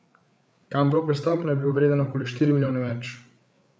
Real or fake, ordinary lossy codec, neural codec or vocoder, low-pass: fake; none; codec, 16 kHz, 8 kbps, FreqCodec, larger model; none